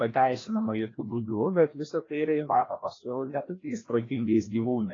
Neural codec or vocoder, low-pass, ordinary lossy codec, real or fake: codec, 16 kHz, 1 kbps, FreqCodec, larger model; 7.2 kHz; AAC, 32 kbps; fake